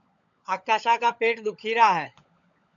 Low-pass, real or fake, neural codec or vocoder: 7.2 kHz; fake; codec, 16 kHz, 16 kbps, FunCodec, trained on LibriTTS, 50 frames a second